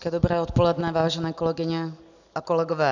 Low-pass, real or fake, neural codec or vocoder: 7.2 kHz; real; none